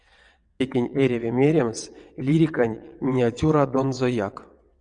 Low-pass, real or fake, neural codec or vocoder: 9.9 kHz; fake; vocoder, 22.05 kHz, 80 mel bands, WaveNeXt